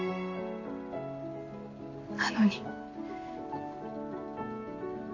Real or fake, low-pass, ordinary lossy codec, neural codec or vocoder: real; 7.2 kHz; MP3, 32 kbps; none